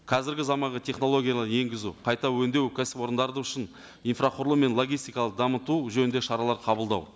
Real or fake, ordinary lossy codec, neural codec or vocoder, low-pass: real; none; none; none